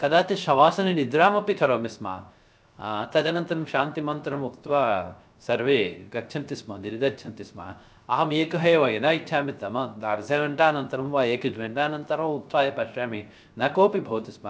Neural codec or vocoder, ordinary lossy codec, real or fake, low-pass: codec, 16 kHz, 0.3 kbps, FocalCodec; none; fake; none